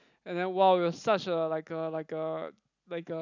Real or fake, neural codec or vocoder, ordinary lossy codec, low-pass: real; none; none; 7.2 kHz